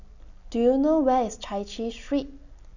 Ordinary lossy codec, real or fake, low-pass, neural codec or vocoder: none; real; 7.2 kHz; none